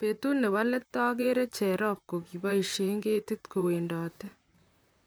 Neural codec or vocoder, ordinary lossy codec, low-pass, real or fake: vocoder, 44.1 kHz, 128 mel bands, Pupu-Vocoder; none; none; fake